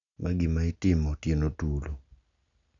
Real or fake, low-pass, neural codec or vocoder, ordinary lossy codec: real; 7.2 kHz; none; none